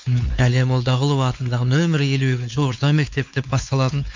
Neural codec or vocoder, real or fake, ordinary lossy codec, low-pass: codec, 16 kHz, 4 kbps, X-Codec, WavLM features, trained on Multilingual LibriSpeech; fake; MP3, 64 kbps; 7.2 kHz